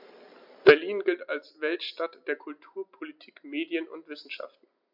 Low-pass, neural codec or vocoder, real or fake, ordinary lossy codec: 5.4 kHz; none; real; MP3, 48 kbps